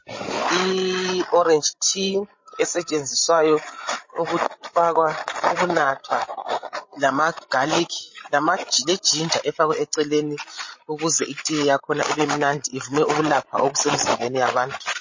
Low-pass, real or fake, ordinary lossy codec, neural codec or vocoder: 7.2 kHz; fake; MP3, 32 kbps; codec, 16 kHz, 16 kbps, FreqCodec, larger model